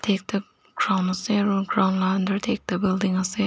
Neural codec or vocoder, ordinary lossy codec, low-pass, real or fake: none; none; none; real